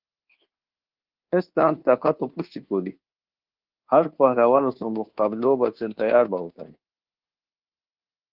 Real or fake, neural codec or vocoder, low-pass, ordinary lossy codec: fake; codec, 24 kHz, 1.2 kbps, DualCodec; 5.4 kHz; Opus, 16 kbps